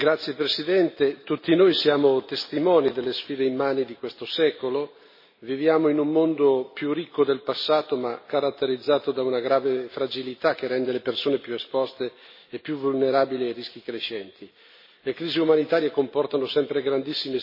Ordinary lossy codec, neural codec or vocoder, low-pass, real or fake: MP3, 24 kbps; none; 5.4 kHz; real